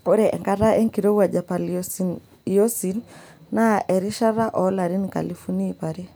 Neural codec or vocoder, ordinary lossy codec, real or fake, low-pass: none; none; real; none